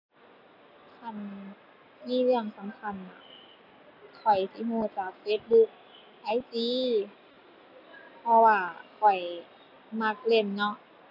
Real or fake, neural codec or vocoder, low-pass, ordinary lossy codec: real; none; 5.4 kHz; none